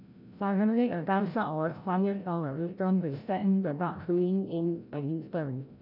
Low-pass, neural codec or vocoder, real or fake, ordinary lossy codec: 5.4 kHz; codec, 16 kHz, 0.5 kbps, FreqCodec, larger model; fake; none